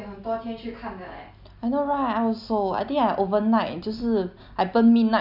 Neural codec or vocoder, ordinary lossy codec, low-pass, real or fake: none; none; 5.4 kHz; real